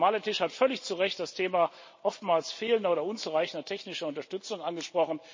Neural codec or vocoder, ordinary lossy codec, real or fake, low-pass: none; MP3, 32 kbps; real; 7.2 kHz